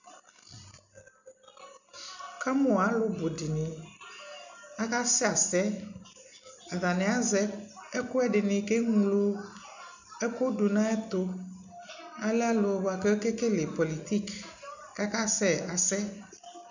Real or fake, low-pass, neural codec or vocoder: real; 7.2 kHz; none